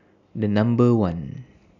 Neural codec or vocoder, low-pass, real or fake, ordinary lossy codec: none; 7.2 kHz; real; none